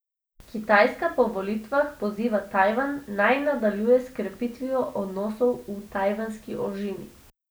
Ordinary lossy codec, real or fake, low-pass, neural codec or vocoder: none; real; none; none